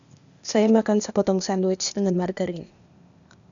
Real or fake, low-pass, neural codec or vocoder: fake; 7.2 kHz; codec, 16 kHz, 0.8 kbps, ZipCodec